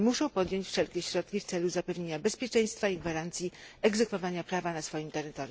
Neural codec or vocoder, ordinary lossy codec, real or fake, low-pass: none; none; real; none